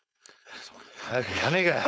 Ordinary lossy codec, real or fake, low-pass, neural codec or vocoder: none; fake; none; codec, 16 kHz, 4.8 kbps, FACodec